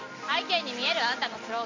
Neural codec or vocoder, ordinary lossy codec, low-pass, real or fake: none; MP3, 48 kbps; 7.2 kHz; real